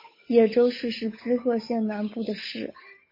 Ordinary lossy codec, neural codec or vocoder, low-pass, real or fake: MP3, 24 kbps; codec, 16 kHz, 8 kbps, FunCodec, trained on Chinese and English, 25 frames a second; 5.4 kHz; fake